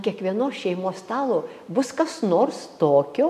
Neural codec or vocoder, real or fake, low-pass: none; real; 14.4 kHz